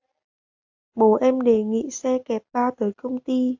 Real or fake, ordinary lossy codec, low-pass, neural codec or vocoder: real; AAC, 48 kbps; 7.2 kHz; none